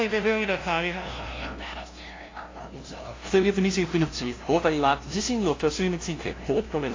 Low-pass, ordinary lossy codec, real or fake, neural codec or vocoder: 7.2 kHz; AAC, 32 kbps; fake; codec, 16 kHz, 0.5 kbps, FunCodec, trained on LibriTTS, 25 frames a second